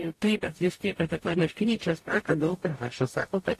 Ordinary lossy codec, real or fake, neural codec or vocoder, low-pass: AAC, 48 kbps; fake; codec, 44.1 kHz, 0.9 kbps, DAC; 14.4 kHz